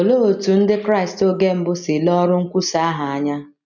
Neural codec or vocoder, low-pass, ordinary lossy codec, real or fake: none; 7.2 kHz; none; real